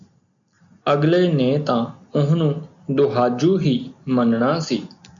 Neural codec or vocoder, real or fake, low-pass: none; real; 7.2 kHz